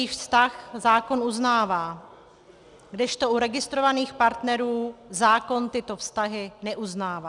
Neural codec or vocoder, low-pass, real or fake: none; 10.8 kHz; real